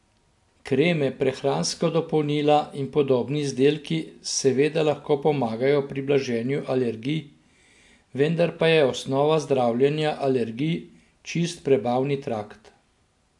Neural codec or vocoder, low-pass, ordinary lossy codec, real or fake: none; 10.8 kHz; AAC, 64 kbps; real